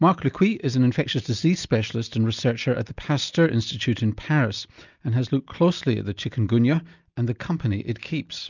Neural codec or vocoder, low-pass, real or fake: none; 7.2 kHz; real